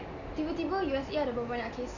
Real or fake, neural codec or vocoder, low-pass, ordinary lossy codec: real; none; 7.2 kHz; none